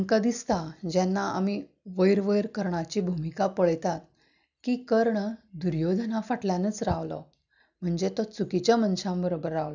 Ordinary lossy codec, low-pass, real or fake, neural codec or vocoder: none; 7.2 kHz; real; none